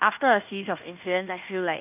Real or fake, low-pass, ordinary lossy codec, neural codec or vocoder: fake; 3.6 kHz; none; codec, 16 kHz in and 24 kHz out, 0.9 kbps, LongCat-Audio-Codec, fine tuned four codebook decoder